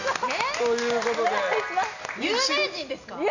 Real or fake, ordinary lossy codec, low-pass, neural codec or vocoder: real; none; 7.2 kHz; none